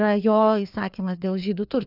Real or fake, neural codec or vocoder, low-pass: fake; codec, 16 kHz, 2 kbps, FreqCodec, larger model; 5.4 kHz